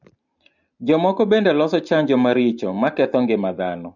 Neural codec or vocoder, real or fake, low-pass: none; real; 7.2 kHz